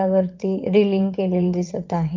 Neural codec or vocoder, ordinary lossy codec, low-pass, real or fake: vocoder, 22.05 kHz, 80 mel bands, WaveNeXt; Opus, 32 kbps; 7.2 kHz; fake